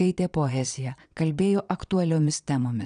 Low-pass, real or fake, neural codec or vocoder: 9.9 kHz; fake; vocoder, 22.05 kHz, 80 mel bands, Vocos